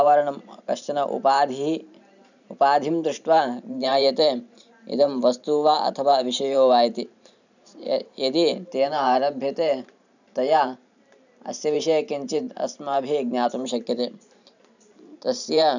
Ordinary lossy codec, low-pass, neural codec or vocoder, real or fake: none; 7.2 kHz; vocoder, 44.1 kHz, 128 mel bands every 512 samples, BigVGAN v2; fake